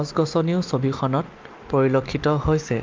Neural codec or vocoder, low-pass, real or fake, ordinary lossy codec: none; 7.2 kHz; real; Opus, 24 kbps